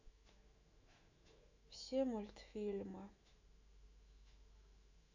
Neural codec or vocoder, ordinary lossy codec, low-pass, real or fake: autoencoder, 48 kHz, 128 numbers a frame, DAC-VAE, trained on Japanese speech; none; 7.2 kHz; fake